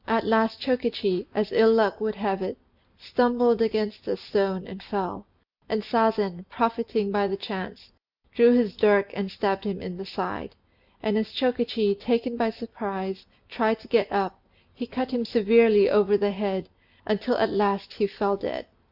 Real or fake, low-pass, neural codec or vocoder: real; 5.4 kHz; none